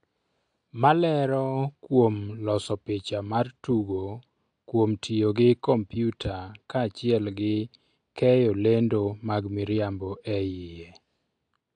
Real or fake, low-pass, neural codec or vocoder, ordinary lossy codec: real; 9.9 kHz; none; AAC, 64 kbps